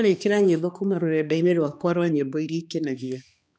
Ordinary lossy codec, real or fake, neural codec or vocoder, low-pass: none; fake; codec, 16 kHz, 2 kbps, X-Codec, HuBERT features, trained on balanced general audio; none